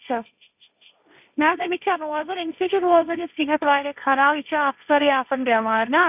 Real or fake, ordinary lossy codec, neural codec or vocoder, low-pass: fake; none; codec, 16 kHz, 1.1 kbps, Voila-Tokenizer; 3.6 kHz